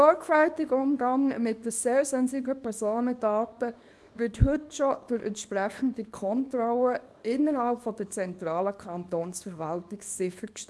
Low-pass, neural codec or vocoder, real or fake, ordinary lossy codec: none; codec, 24 kHz, 0.9 kbps, WavTokenizer, small release; fake; none